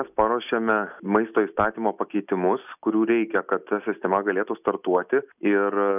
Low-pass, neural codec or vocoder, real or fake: 3.6 kHz; none; real